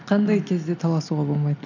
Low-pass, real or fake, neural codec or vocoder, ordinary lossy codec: 7.2 kHz; real; none; none